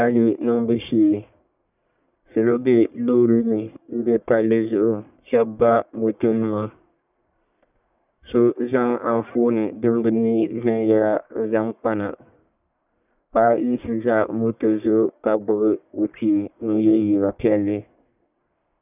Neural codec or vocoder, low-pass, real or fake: codec, 44.1 kHz, 1.7 kbps, Pupu-Codec; 3.6 kHz; fake